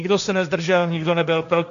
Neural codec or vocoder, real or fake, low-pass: codec, 16 kHz, 1.1 kbps, Voila-Tokenizer; fake; 7.2 kHz